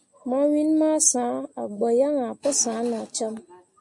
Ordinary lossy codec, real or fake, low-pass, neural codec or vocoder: MP3, 48 kbps; real; 10.8 kHz; none